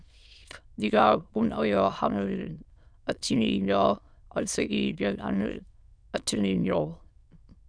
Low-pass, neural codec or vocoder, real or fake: 9.9 kHz; autoencoder, 22.05 kHz, a latent of 192 numbers a frame, VITS, trained on many speakers; fake